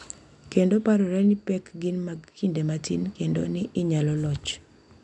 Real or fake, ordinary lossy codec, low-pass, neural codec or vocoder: real; none; 10.8 kHz; none